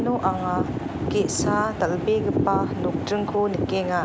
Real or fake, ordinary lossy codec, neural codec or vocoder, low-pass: real; none; none; none